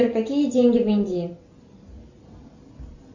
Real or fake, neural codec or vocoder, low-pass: real; none; 7.2 kHz